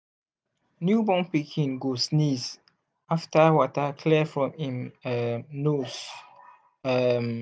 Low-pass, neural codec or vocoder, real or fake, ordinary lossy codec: none; none; real; none